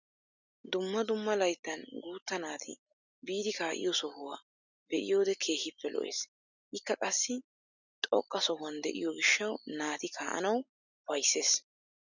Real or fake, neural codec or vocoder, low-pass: real; none; 7.2 kHz